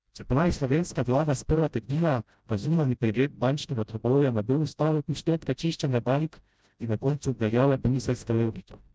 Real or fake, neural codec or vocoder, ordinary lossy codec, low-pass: fake; codec, 16 kHz, 0.5 kbps, FreqCodec, smaller model; none; none